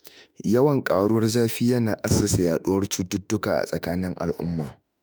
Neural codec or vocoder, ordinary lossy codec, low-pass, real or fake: autoencoder, 48 kHz, 32 numbers a frame, DAC-VAE, trained on Japanese speech; none; none; fake